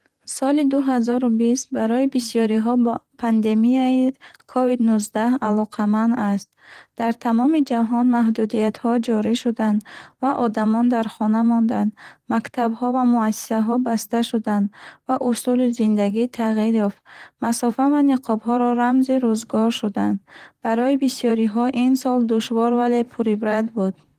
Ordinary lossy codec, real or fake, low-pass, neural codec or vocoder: Opus, 24 kbps; fake; 14.4 kHz; vocoder, 44.1 kHz, 128 mel bands, Pupu-Vocoder